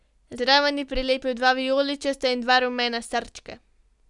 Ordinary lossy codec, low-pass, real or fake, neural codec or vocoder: none; 10.8 kHz; real; none